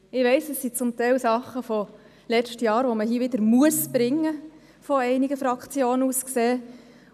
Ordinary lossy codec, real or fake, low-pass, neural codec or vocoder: none; real; 14.4 kHz; none